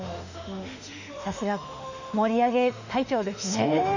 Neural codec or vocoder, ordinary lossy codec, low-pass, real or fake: autoencoder, 48 kHz, 32 numbers a frame, DAC-VAE, trained on Japanese speech; none; 7.2 kHz; fake